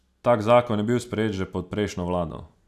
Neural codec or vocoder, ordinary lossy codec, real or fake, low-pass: none; none; real; 14.4 kHz